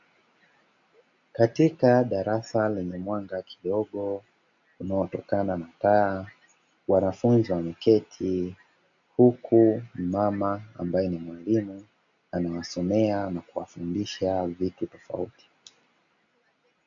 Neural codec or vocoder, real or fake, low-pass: none; real; 7.2 kHz